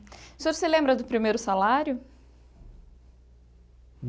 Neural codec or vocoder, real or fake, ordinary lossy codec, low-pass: none; real; none; none